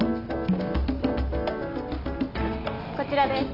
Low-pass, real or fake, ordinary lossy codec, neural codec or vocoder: 5.4 kHz; real; none; none